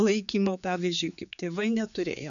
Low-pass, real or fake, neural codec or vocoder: 7.2 kHz; fake; codec, 16 kHz, 4 kbps, X-Codec, HuBERT features, trained on general audio